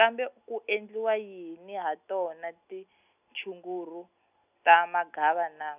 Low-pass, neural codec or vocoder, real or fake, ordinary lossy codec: 3.6 kHz; none; real; none